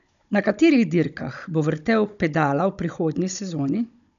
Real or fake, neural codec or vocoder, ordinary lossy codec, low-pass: fake; codec, 16 kHz, 16 kbps, FunCodec, trained on Chinese and English, 50 frames a second; none; 7.2 kHz